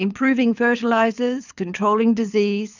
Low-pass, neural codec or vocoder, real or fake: 7.2 kHz; codec, 24 kHz, 6 kbps, HILCodec; fake